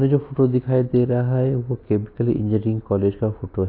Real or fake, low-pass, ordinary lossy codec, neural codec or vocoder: real; 5.4 kHz; AAC, 32 kbps; none